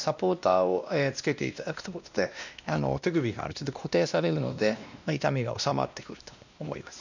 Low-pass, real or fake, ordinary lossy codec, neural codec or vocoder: 7.2 kHz; fake; none; codec, 16 kHz, 1 kbps, X-Codec, WavLM features, trained on Multilingual LibriSpeech